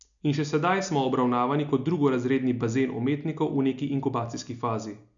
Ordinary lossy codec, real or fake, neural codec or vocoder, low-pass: none; real; none; 7.2 kHz